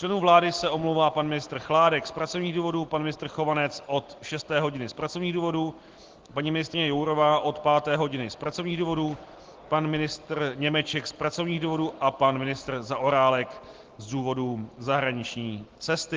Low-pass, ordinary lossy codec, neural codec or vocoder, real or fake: 7.2 kHz; Opus, 16 kbps; none; real